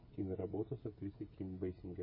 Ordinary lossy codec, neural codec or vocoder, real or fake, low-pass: MP3, 24 kbps; codec, 16 kHz, 16 kbps, FreqCodec, smaller model; fake; 5.4 kHz